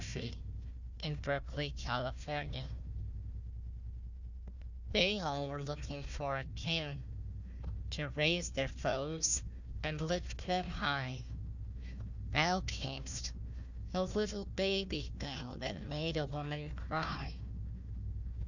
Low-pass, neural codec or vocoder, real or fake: 7.2 kHz; codec, 16 kHz, 1 kbps, FunCodec, trained on Chinese and English, 50 frames a second; fake